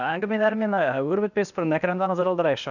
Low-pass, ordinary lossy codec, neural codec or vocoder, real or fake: 7.2 kHz; none; codec, 16 kHz, 0.8 kbps, ZipCodec; fake